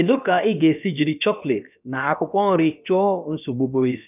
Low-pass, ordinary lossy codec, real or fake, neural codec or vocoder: 3.6 kHz; none; fake; codec, 16 kHz, about 1 kbps, DyCAST, with the encoder's durations